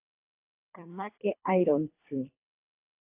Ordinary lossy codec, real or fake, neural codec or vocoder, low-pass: AAC, 24 kbps; fake; codec, 24 kHz, 3 kbps, HILCodec; 3.6 kHz